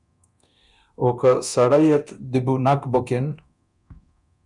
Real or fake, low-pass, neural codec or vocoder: fake; 10.8 kHz; codec, 24 kHz, 0.9 kbps, DualCodec